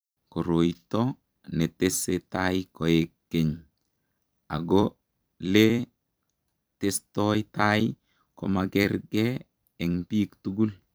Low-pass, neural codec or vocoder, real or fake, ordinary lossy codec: none; vocoder, 44.1 kHz, 128 mel bands every 256 samples, BigVGAN v2; fake; none